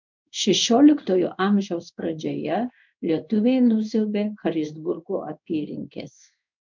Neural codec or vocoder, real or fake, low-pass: codec, 16 kHz in and 24 kHz out, 1 kbps, XY-Tokenizer; fake; 7.2 kHz